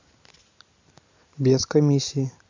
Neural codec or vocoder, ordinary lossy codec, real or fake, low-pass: none; MP3, 64 kbps; real; 7.2 kHz